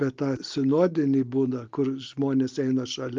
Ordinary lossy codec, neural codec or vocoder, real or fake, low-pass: Opus, 32 kbps; none; real; 7.2 kHz